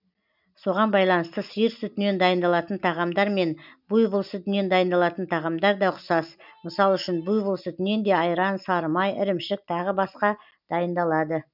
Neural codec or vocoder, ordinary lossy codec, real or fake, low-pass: none; none; real; 5.4 kHz